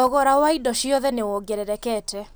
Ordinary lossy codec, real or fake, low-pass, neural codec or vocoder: none; real; none; none